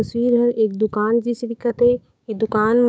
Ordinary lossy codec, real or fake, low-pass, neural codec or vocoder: none; fake; none; codec, 16 kHz, 6 kbps, DAC